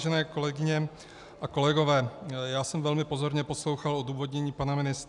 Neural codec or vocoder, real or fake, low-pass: none; real; 10.8 kHz